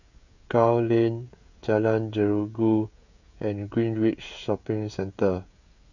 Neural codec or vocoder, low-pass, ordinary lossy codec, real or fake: codec, 16 kHz, 16 kbps, FreqCodec, smaller model; 7.2 kHz; none; fake